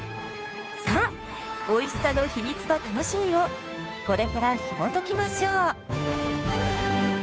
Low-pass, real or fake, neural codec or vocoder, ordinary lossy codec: none; fake; codec, 16 kHz, 2 kbps, FunCodec, trained on Chinese and English, 25 frames a second; none